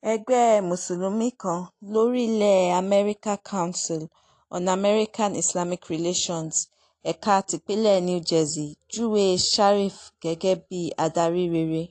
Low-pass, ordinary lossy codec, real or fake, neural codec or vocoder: 10.8 kHz; AAC, 48 kbps; real; none